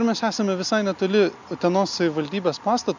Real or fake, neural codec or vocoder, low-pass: real; none; 7.2 kHz